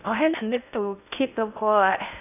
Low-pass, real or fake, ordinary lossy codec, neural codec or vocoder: 3.6 kHz; fake; none; codec, 16 kHz in and 24 kHz out, 0.6 kbps, FocalCodec, streaming, 4096 codes